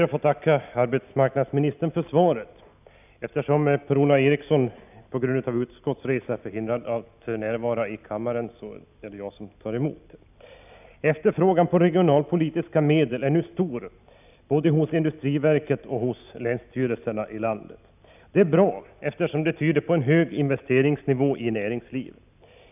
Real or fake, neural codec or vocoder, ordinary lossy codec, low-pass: real; none; none; 3.6 kHz